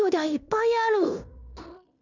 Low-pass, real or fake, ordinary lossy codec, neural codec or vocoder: 7.2 kHz; fake; none; codec, 16 kHz in and 24 kHz out, 0.9 kbps, LongCat-Audio-Codec, fine tuned four codebook decoder